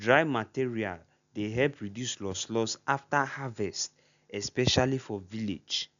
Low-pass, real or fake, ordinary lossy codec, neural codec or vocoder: 7.2 kHz; real; none; none